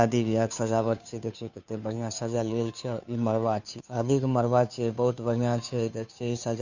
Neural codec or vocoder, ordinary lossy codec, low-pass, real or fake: codec, 16 kHz, 2 kbps, FunCodec, trained on Chinese and English, 25 frames a second; none; 7.2 kHz; fake